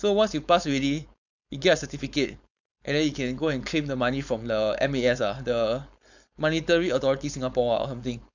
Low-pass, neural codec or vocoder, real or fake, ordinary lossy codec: 7.2 kHz; codec, 16 kHz, 4.8 kbps, FACodec; fake; none